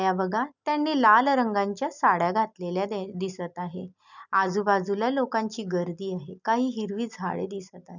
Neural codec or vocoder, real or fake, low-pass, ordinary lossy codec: none; real; 7.2 kHz; none